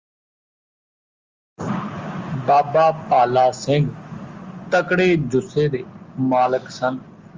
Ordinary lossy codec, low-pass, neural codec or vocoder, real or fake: Opus, 32 kbps; 7.2 kHz; none; real